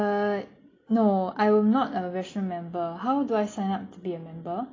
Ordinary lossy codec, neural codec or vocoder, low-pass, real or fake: AAC, 32 kbps; none; 7.2 kHz; real